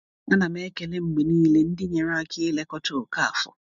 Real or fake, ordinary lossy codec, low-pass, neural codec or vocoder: real; MP3, 64 kbps; 7.2 kHz; none